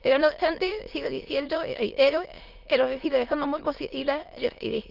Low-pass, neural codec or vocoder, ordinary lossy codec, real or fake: 5.4 kHz; autoencoder, 22.05 kHz, a latent of 192 numbers a frame, VITS, trained on many speakers; Opus, 32 kbps; fake